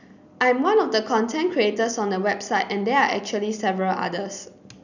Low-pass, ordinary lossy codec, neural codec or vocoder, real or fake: 7.2 kHz; none; none; real